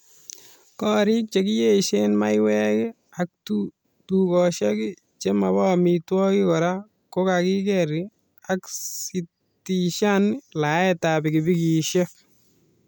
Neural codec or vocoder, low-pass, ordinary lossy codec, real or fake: none; none; none; real